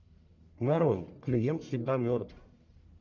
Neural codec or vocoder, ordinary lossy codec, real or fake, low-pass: codec, 44.1 kHz, 1.7 kbps, Pupu-Codec; MP3, 64 kbps; fake; 7.2 kHz